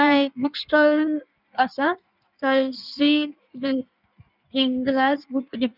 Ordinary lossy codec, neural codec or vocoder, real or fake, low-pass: none; codec, 16 kHz in and 24 kHz out, 1.1 kbps, FireRedTTS-2 codec; fake; 5.4 kHz